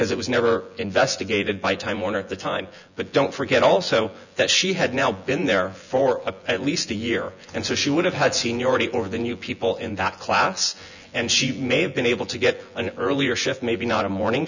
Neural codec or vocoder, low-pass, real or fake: vocoder, 24 kHz, 100 mel bands, Vocos; 7.2 kHz; fake